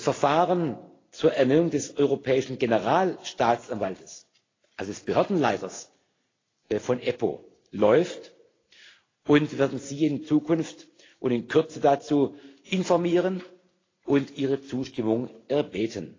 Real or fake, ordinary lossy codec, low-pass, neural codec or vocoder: real; AAC, 32 kbps; 7.2 kHz; none